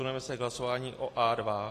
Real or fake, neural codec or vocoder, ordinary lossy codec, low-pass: real; none; AAC, 48 kbps; 14.4 kHz